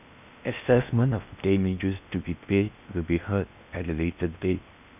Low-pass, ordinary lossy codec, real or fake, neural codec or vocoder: 3.6 kHz; none; fake; codec, 16 kHz in and 24 kHz out, 0.8 kbps, FocalCodec, streaming, 65536 codes